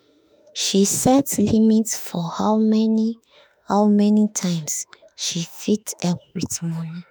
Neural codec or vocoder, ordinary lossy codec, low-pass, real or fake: autoencoder, 48 kHz, 32 numbers a frame, DAC-VAE, trained on Japanese speech; none; none; fake